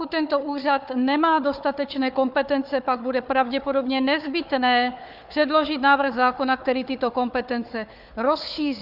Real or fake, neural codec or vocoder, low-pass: fake; codec, 16 kHz, 4 kbps, FunCodec, trained on Chinese and English, 50 frames a second; 5.4 kHz